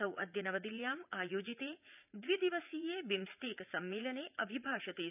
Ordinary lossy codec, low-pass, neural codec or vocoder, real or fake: none; 3.6 kHz; vocoder, 44.1 kHz, 80 mel bands, Vocos; fake